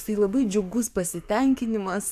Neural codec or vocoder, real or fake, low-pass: codec, 44.1 kHz, 7.8 kbps, DAC; fake; 14.4 kHz